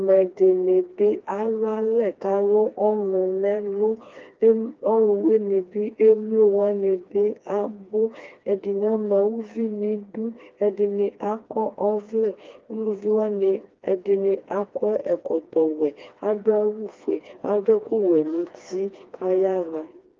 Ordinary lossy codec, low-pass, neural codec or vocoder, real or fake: Opus, 24 kbps; 7.2 kHz; codec, 16 kHz, 2 kbps, FreqCodec, smaller model; fake